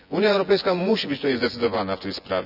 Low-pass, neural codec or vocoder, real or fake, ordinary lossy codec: 5.4 kHz; vocoder, 24 kHz, 100 mel bands, Vocos; fake; none